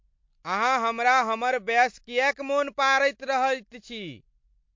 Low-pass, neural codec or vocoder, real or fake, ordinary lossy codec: 7.2 kHz; none; real; MP3, 48 kbps